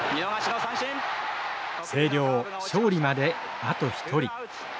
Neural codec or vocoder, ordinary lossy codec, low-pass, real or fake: none; none; none; real